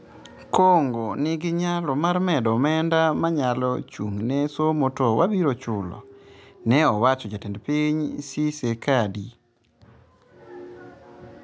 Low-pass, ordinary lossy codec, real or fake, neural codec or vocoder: none; none; real; none